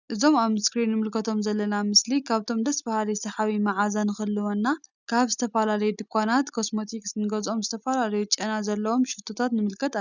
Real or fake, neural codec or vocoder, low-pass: real; none; 7.2 kHz